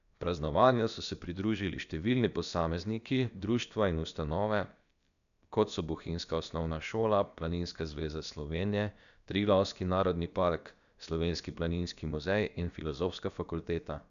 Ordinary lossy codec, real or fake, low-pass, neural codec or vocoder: none; fake; 7.2 kHz; codec, 16 kHz, about 1 kbps, DyCAST, with the encoder's durations